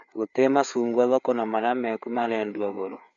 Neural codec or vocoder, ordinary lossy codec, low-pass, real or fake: codec, 16 kHz, 4 kbps, FreqCodec, larger model; none; 7.2 kHz; fake